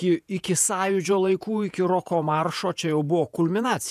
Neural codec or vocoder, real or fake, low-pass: vocoder, 48 kHz, 128 mel bands, Vocos; fake; 14.4 kHz